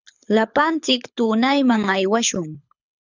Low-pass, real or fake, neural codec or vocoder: 7.2 kHz; fake; codec, 24 kHz, 6 kbps, HILCodec